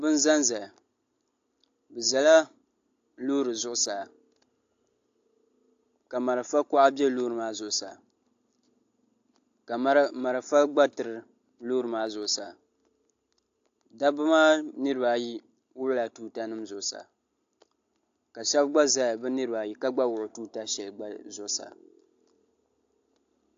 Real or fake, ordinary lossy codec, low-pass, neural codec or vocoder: real; AAC, 48 kbps; 7.2 kHz; none